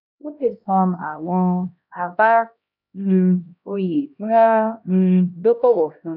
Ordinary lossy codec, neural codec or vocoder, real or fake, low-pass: none; codec, 16 kHz, 1 kbps, X-Codec, HuBERT features, trained on LibriSpeech; fake; 5.4 kHz